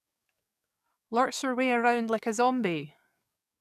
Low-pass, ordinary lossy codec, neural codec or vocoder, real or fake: 14.4 kHz; none; codec, 44.1 kHz, 7.8 kbps, DAC; fake